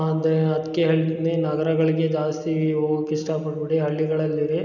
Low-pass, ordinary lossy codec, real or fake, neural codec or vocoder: 7.2 kHz; none; real; none